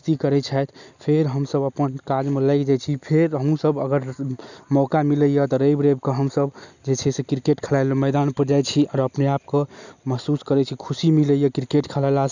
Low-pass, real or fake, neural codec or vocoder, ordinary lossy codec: 7.2 kHz; real; none; none